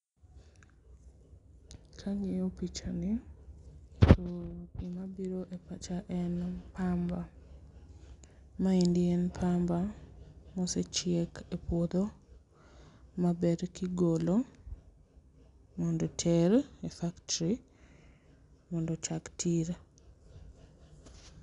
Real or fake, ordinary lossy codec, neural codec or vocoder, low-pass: real; none; none; 10.8 kHz